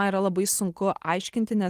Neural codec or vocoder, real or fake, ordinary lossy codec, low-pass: none; real; Opus, 24 kbps; 14.4 kHz